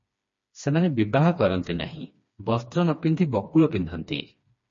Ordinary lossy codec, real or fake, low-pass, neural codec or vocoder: MP3, 32 kbps; fake; 7.2 kHz; codec, 16 kHz, 2 kbps, FreqCodec, smaller model